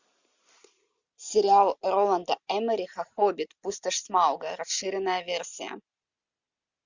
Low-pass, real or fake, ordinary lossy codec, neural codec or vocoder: 7.2 kHz; real; Opus, 64 kbps; none